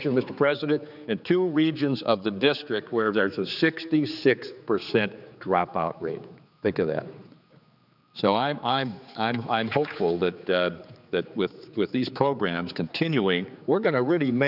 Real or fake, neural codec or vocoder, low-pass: fake; codec, 16 kHz, 4 kbps, X-Codec, HuBERT features, trained on general audio; 5.4 kHz